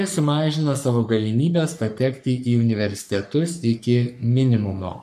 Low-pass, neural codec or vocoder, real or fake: 14.4 kHz; codec, 44.1 kHz, 3.4 kbps, Pupu-Codec; fake